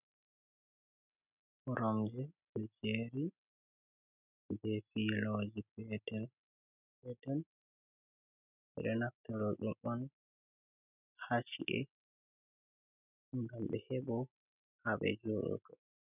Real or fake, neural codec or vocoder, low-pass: real; none; 3.6 kHz